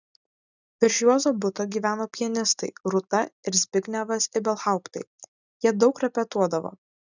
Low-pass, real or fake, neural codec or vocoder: 7.2 kHz; real; none